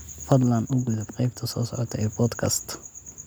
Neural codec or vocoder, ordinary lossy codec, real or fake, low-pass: none; none; real; none